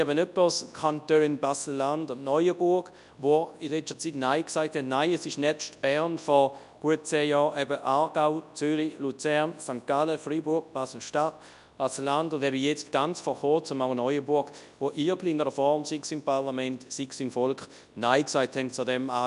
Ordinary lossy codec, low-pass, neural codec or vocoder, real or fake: none; 10.8 kHz; codec, 24 kHz, 0.9 kbps, WavTokenizer, large speech release; fake